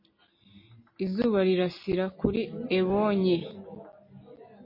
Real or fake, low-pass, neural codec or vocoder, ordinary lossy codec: real; 5.4 kHz; none; MP3, 24 kbps